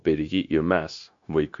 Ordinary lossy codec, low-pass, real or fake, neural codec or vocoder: MP3, 48 kbps; 7.2 kHz; fake; codec, 16 kHz, 0.9 kbps, LongCat-Audio-Codec